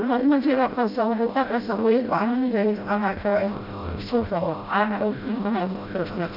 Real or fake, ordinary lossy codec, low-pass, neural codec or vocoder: fake; none; 5.4 kHz; codec, 16 kHz, 0.5 kbps, FreqCodec, smaller model